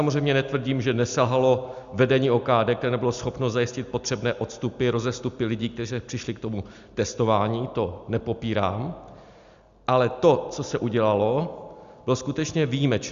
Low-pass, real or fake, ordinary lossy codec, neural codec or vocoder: 7.2 kHz; real; Opus, 64 kbps; none